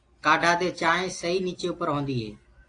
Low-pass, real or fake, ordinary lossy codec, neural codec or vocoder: 9.9 kHz; real; AAC, 48 kbps; none